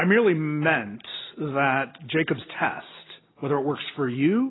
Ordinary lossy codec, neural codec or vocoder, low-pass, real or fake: AAC, 16 kbps; none; 7.2 kHz; real